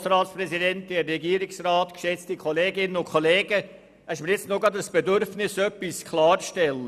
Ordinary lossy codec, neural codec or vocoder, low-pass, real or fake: none; none; 14.4 kHz; real